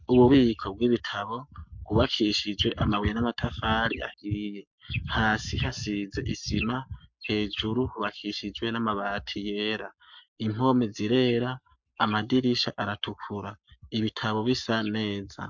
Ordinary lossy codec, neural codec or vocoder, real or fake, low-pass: MP3, 64 kbps; codec, 44.1 kHz, 7.8 kbps, Pupu-Codec; fake; 7.2 kHz